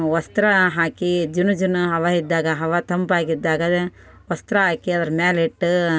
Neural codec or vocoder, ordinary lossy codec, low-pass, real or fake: none; none; none; real